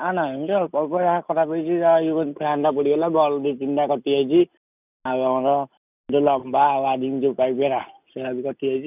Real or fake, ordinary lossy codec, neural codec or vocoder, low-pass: real; none; none; 3.6 kHz